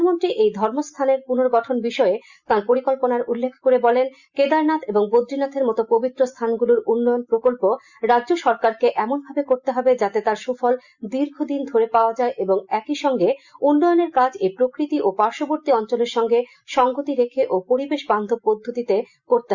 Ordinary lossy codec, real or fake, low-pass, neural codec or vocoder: Opus, 64 kbps; real; 7.2 kHz; none